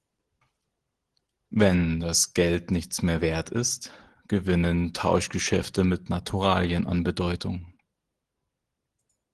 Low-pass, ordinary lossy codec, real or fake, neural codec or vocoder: 10.8 kHz; Opus, 16 kbps; real; none